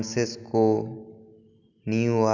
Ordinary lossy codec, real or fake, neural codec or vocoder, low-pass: none; real; none; 7.2 kHz